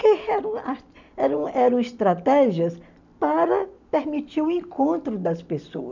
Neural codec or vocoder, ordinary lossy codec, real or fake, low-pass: none; none; real; 7.2 kHz